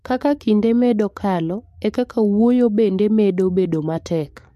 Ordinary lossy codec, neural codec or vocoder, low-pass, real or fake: MP3, 64 kbps; codec, 44.1 kHz, 7.8 kbps, DAC; 14.4 kHz; fake